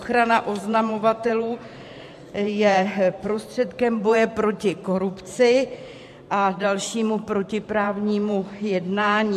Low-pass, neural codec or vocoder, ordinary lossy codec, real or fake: 14.4 kHz; vocoder, 44.1 kHz, 128 mel bands every 512 samples, BigVGAN v2; MP3, 64 kbps; fake